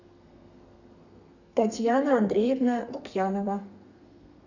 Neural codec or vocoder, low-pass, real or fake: codec, 32 kHz, 1.9 kbps, SNAC; 7.2 kHz; fake